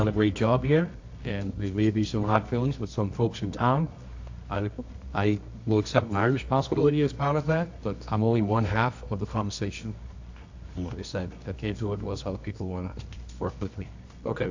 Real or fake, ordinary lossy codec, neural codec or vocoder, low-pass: fake; AAC, 48 kbps; codec, 24 kHz, 0.9 kbps, WavTokenizer, medium music audio release; 7.2 kHz